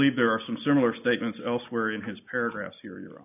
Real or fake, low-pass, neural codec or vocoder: real; 3.6 kHz; none